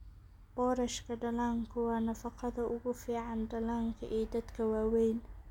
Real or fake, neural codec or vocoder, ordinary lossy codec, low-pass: real; none; none; 19.8 kHz